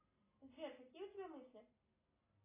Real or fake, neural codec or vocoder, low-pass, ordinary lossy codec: real; none; 3.6 kHz; AAC, 24 kbps